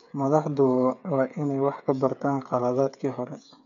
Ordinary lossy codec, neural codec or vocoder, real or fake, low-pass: none; codec, 16 kHz, 8 kbps, FreqCodec, smaller model; fake; 7.2 kHz